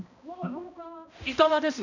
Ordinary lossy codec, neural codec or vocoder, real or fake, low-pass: none; codec, 16 kHz, 1 kbps, X-Codec, HuBERT features, trained on balanced general audio; fake; 7.2 kHz